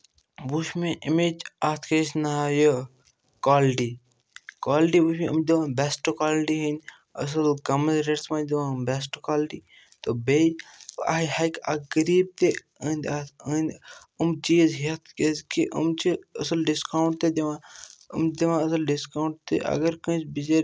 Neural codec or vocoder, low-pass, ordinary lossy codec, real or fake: none; none; none; real